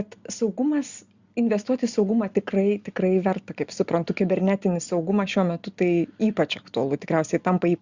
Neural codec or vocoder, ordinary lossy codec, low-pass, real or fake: none; Opus, 64 kbps; 7.2 kHz; real